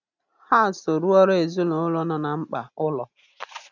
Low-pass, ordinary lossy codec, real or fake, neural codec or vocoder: 7.2 kHz; none; real; none